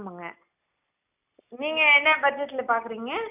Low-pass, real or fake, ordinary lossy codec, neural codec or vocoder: 3.6 kHz; real; none; none